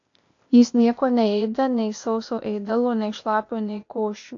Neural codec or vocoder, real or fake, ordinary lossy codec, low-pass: codec, 16 kHz, 0.8 kbps, ZipCodec; fake; AAC, 48 kbps; 7.2 kHz